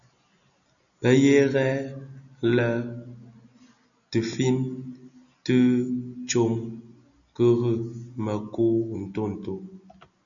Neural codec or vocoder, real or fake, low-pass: none; real; 7.2 kHz